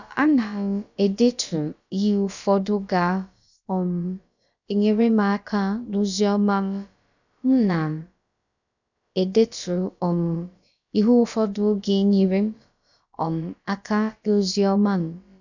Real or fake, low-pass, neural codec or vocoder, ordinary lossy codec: fake; 7.2 kHz; codec, 16 kHz, about 1 kbps, DyCAST, with the encoder's durations; none